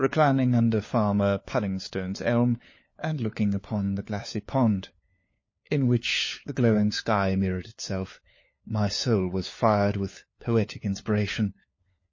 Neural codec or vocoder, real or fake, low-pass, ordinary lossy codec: codec, 16 kHz, 4 kbps, FunCodec, trained on LibriTTS, 50 frames a second; fake; 7.2 kHz; MP3, 32 kbps